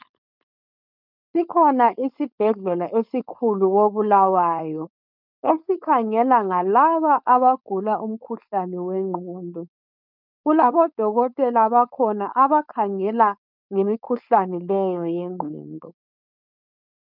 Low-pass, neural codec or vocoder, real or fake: 5.4 kHz; codec, 16 kHz, 4.8 kbps, FACodec; fake